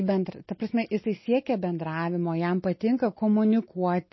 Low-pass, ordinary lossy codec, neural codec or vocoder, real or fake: 7.2 kHz; MP3, 24 kbps; none; real